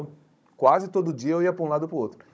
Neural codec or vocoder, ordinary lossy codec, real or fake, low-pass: codec, 16 kHz, 16 kbps, FunCodec, trained on Chinese and English, 50 frames a second; none; fake; none